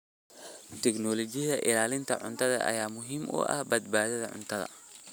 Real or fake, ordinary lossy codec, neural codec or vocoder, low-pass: real; none; none; none